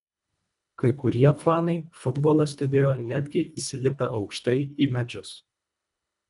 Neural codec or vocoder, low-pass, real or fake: codec, 24 kHz, 1.5 kbps, HILCodec; 10.8 kHz; fake